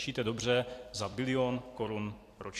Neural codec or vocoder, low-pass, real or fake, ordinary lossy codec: vocoder, 44.1 kHz, 128 mel bands every 256 samples, BigVGAN v2; 14.4 kHz; fake; AAC, 64 kbps